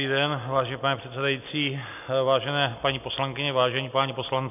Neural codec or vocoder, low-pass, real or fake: none; 3.6 kHz; real